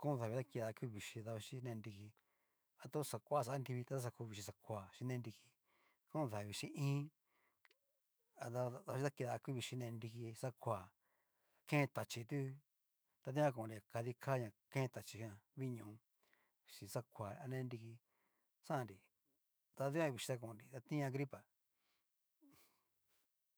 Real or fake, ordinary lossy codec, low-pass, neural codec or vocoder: fake; none; none; autoencoder, 48 kHz, 128 numbers a frame, DAC-VAE, trained on Japanese speech